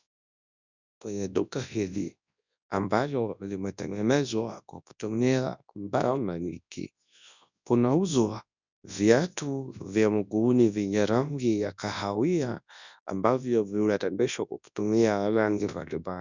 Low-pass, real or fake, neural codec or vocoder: 7.2 kHz; fake; codec, 24 kHz, 0.9 kbps, WavTokenizer, large speech release